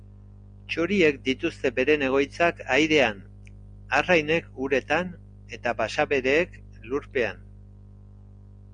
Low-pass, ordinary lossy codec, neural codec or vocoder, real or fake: 9.9 kHz; Opus, 64 kbps; none; real